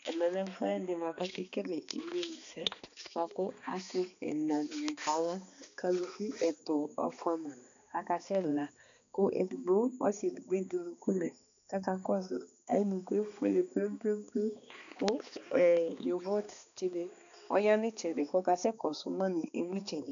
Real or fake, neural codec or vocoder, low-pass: fake; codec, 16 kHz, 2 kbps, X-Codec, HuBERT features, trained on balanced general audio; 7.2 kHz